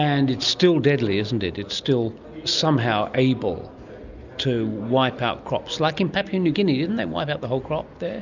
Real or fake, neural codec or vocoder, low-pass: real; none; 7.2 kHz